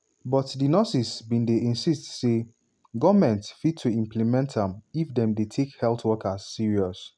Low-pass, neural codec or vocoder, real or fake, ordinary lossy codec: 9.9 kHz; none; real; none